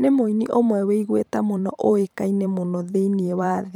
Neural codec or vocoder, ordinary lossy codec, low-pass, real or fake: vocoder, 44.1 kHz, 128 mel bands every 512 samples, BigVGAN v2; none; 19.8 kHz; fake